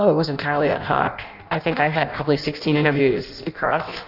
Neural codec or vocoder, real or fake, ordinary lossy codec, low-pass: codec, 16 kHz in and 24 kHz out, 0.6 kbps, FireRedTTS-2 codec; fake; AAC, 48 kbps; 5.4 kHz